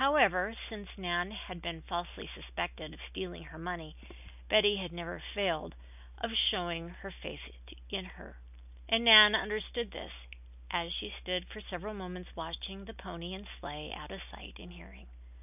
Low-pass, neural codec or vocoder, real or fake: 3.6 kHz; none; real